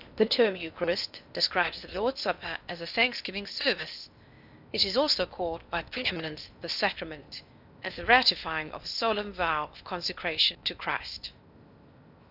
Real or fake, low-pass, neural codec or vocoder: fake; 5.4 kHz; codec, 16 kHz in and 24 kHz out, 0.6 kbps, FocalCodec, streaming, 2048 codes